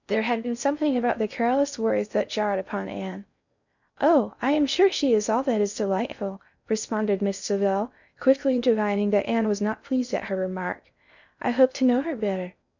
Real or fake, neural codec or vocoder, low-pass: fake; codec, 16 kHz in and 24 kHz out, 0.6 kbps, FocalCodec, streaming, 4096 codes; 7.2 kHz